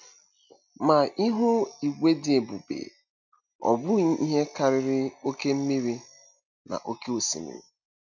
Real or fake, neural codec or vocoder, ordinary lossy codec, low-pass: real; none; none; 7.2 kHz